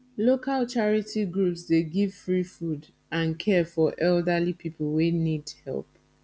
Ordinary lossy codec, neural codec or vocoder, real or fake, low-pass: none; none; real; none